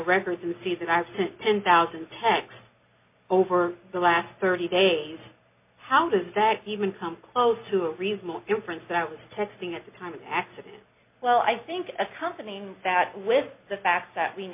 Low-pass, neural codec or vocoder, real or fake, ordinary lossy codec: 3.6 kHz; none; real; MP3, 32 kbps